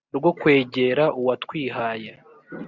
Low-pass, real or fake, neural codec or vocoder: 7.2 kHz; real; none